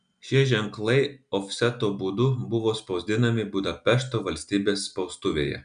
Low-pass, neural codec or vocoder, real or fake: 9.9 kHz; none; real